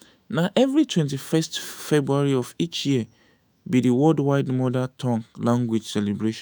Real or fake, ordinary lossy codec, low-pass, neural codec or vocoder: fake; none; none; autoencoder, 48 kHz, 128 numbers a frame, DAC-VAE, trained on Japanese speech